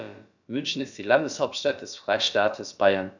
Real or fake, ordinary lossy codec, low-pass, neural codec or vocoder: fake; none; 7.2 kHz; codec, 16 kHz, about 1 kbps, DyCAST, with the encoder's durations